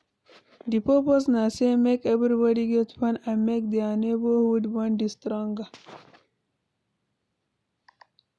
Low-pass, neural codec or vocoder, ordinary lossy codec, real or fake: none; none; none; real